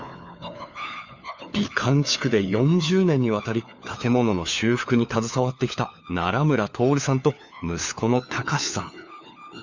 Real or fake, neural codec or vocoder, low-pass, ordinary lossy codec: fake; codec, 16 kHz, 4 kbps, FunCodec, trained on LibriTTS, 50 frames a second; 7.2 kHz; Opus, 64 kbps